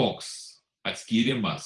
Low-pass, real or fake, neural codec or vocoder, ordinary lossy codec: 10.8 kHz; fake; vocoder, 44.1 kHz, 128 mel bands every 512 samples, BigVGAN v2; Opus, 24 kbps